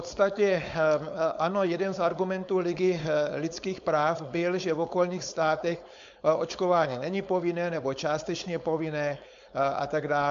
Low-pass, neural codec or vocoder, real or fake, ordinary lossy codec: 7.2 kHz; codec, 16 kHz, 4.8 kbps, FACodec; fake; AAC, 64 kbps